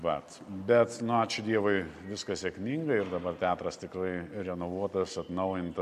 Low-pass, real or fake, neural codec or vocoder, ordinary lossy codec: 14.4 kHz; real; none; AAC, 96 kbps